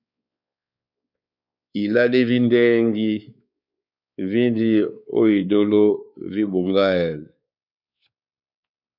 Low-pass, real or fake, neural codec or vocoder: 5.4 kHz; fake; codec, 16 kHz, 4 kbps, X-Codec, WavLM features, trained on Multilingual LibriSpeech